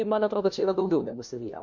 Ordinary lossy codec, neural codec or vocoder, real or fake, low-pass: MP3, 64 kbps; codec, 16 kHz, 1 kbps, FunCodec, trained on LibriTTS, 50 frames a second; fake; 7.2 kHz